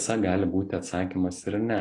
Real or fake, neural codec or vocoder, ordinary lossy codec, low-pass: real; none; AAC, 32 kbps; 10.8 kHz